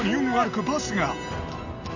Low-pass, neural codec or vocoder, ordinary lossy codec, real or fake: 7.2 kHz; none; none; real